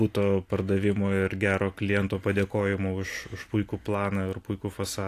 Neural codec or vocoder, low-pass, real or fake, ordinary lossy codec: none; 14.4 kHz; real; AAC, 64 kbps